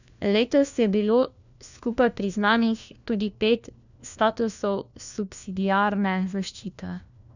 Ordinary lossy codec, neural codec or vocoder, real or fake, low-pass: none; codec, 16 kHz, 1 kbps, FunCodec, trained on LibriTTS, 50 frames a second; fake; 7.2 kHz